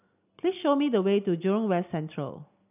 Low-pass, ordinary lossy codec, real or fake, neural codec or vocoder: 3.6 kHz; none; real; none